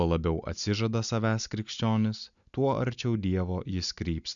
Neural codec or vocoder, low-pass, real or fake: none; 7.2 kHz; real